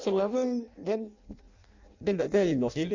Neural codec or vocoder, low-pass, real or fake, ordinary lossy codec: codec, 16 kHz in and 24 kHz out, 0.6 kbps, FireRedTTS-2 codec; 7.2 kHz; fake; Opus, 64 kbps